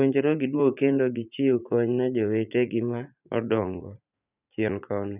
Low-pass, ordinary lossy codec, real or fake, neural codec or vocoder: 3.6 kHz; none; fake; vocoder, 44.1 kHz, 80 mel bands, Vocos